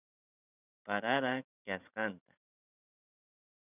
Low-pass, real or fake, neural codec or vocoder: 3.6 kHz; fake; vocoder, 44.1 kHz, 128 mel bands every 512 samples, BigVGAN v2